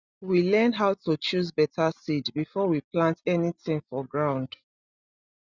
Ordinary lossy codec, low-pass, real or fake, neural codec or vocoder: Opus, 64 kbps; 7.2 kHz; real; none